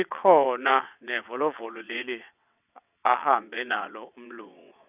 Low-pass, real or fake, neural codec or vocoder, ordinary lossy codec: 3.6 kHz; fake; vocoder, 22.05 kHz, 80 mel bands, WaveNeXt; none